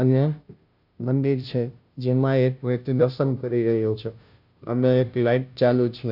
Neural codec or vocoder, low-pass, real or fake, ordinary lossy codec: codec, 16 kHz, 0.5 kbps, FunCodec, trained on Chinese and English, 25 frames a second; 5.4 kHz; fake; none